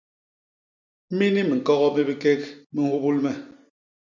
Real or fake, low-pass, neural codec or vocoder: real; 7.2 kHz; none